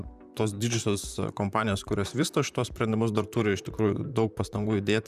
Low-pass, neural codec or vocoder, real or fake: 14.4 kHz; vocoder, 44.1 kHz, 128 mel bands, Pupu-Vocoder; fake